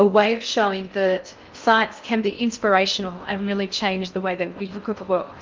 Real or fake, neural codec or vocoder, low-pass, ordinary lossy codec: fake; codec, 16 kHz in and 24 kHz out, 0.6 kbps, FocalCodec, streaming, 4096 codes; 7.2 kHz; Opus, 24 kbps